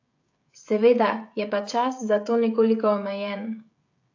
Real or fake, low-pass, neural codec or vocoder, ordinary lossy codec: fake; 7.2 kHz; codec, 16 kHz, 16 kbps, FreqCodec, smaller model; AAC, 48 kbps